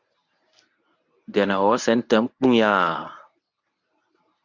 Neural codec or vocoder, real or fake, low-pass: codec, 24 kHz, 0.9 kbps, WavTokenizer, medium speech release version 1; fake; 7.2 kHz